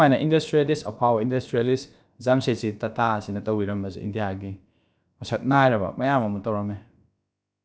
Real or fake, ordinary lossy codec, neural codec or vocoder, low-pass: fake; none; codec, 16 kHz, about 1 kbps, DyCAST, with the encoder's durations; none